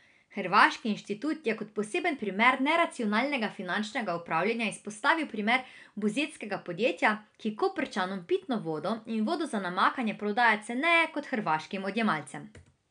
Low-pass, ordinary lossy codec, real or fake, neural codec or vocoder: 9.9 kHz; none; real; none